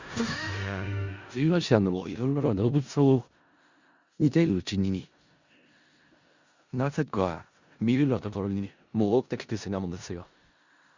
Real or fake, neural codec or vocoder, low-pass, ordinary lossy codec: fake; codec, 16 kHz in and 24 kHz out, 0.4 kbps, LongCat-Audio-Codec, four codebook decoder; 7.2 kHz; Opus, 64 kbps